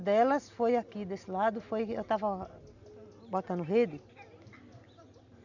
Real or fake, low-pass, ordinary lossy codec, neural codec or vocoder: real; 7.2 kHz; none; none